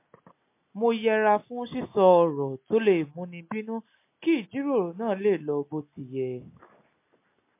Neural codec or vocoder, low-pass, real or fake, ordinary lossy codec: none; 3.6 kHz; real; MP3, 24 kbps